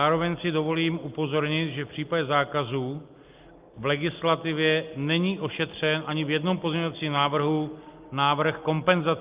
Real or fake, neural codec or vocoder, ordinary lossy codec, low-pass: real; none; Opus, 24 kbps; 3.6 kHz